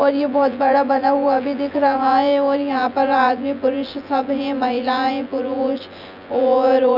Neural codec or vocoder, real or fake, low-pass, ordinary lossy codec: vocoder, 24 kHz, 100 mel bands, Vocos; fake; 5.4 kHz; none